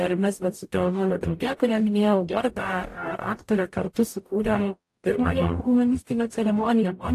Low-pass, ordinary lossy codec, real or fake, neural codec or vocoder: 14.4 kHz; AAC, 64 kbps; fake; codec, 44.1 kHz, 0.9 kbps, DAC